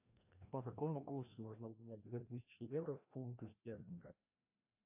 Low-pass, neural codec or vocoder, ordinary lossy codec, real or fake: 3.6 kHz; codec, 16 kHz, 1 kbps, FreqCodec, larger model; AAC, 32 kbps; fake